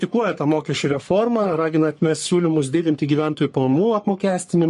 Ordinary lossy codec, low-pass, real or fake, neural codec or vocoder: MP3, 48 kbps; 14.4 kHz; fake; codec, 44.1 kHz, 3.4 kbps, Pupu-Codec